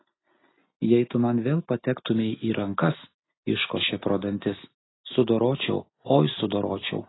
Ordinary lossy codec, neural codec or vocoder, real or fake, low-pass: AAC, 16 kbps; none; real; 7.2 kHz